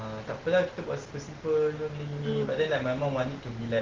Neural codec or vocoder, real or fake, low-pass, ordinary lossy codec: none; real; 7.2 kHz; Opus, 16 kbps